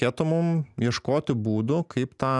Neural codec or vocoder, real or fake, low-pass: none; real; 10.8 kHz